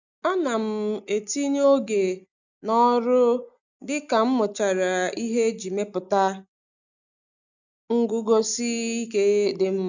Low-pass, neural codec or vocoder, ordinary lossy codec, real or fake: 7.2 kHz; none; none; real